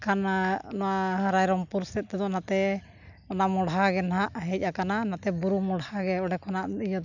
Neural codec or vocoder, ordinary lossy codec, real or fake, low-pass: none; none; real; 7.2 kHz